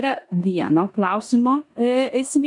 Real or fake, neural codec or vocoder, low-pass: fake; codec, 16 kHz in and 24 kHz out, 0.9 kbps, LongCat-Audio-Codec, four codebook decoder; 10.8 kHz